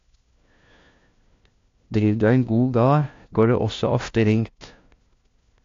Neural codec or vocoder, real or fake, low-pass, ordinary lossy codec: codec, 16 kHz, 1 kbps, FunCodec, trained on LibriTTS, 50 frames a second; fake; 7.2 kHz; AAC, 48 kbps